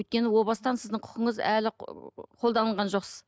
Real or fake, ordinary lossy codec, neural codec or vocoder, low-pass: real; none; none; none